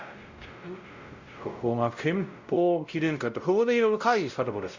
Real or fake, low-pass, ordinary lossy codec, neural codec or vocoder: fake; 7.2 kHz; Opus, 64 kbps; codec, 16 kHz, 0.5 kbps, X-Codec, WavLM features, trained on Multilingual LibriSpeech